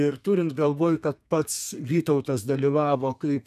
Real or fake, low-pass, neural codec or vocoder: fake; 14.4 kHz; codec, 32 kHz, 1.9 kbps, SNAC